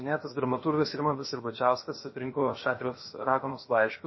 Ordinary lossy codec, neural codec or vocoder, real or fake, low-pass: MP3, 24 kbps; codec, 16 kHz, 0.7 kbps, FocalCodec; fake; 7.2 kHz